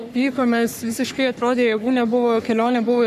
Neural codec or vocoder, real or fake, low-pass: codec, 44.1 kHz, 3.4 kbps, Pupu-Codec; fake; 14.4 kHz